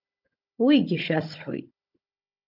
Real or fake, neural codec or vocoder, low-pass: fake; codec, 16 kHz, 16 kbps, FunCodec, trained on Chinese and English, 50 frames a second; 5.4 kHz